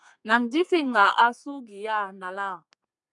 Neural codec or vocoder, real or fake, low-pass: codec, 32 kHz, 1.9 kbps, SNAC; fake; 10.8 kHz